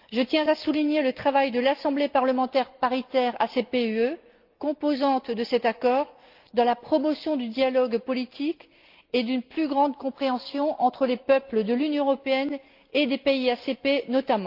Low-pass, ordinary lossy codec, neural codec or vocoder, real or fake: 5.4 kHz; Opus, 24 kbps; none; real